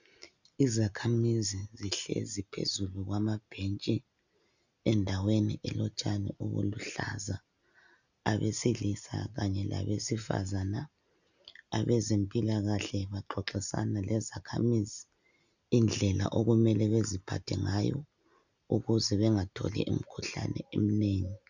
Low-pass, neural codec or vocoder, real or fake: 7.2 kHz; none; real